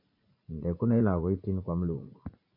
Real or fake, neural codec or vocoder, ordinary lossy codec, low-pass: fake; vocoder, 44.1 kHz, 80 mel bands, Vocos; MP3, 32 kbps; 5.4 kHz